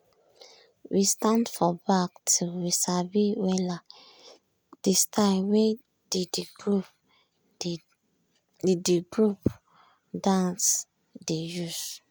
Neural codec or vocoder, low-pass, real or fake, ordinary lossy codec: none; none; real; none